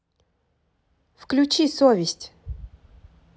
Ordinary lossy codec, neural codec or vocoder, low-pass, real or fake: none; none; none; real